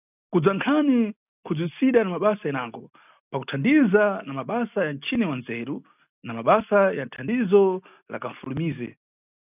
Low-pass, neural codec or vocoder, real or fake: 3.6 kHz; none; real